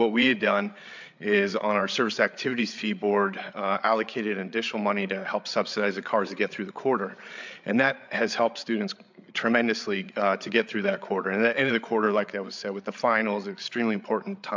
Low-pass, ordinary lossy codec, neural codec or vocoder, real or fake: 7.2 kHz; MP3, 64 kbps; codec, 16 kHz, 8 kbps, FreqCodec, larger model; fake